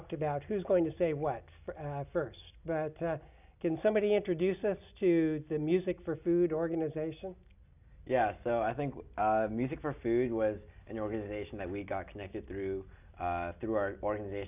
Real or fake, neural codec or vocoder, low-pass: real; none; 3.6 kHz